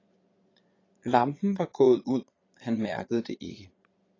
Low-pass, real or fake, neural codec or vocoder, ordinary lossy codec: 7.2 kHz; fake; vocoder, 22.05 kHz, 80 mel bands, Vocos; AAC, 32 kbps